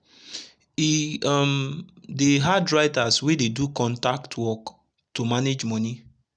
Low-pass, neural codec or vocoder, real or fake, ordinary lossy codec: 9.9 kHz; none; real; none